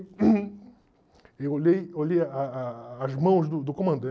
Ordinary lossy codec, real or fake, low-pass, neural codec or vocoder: none; real; none; none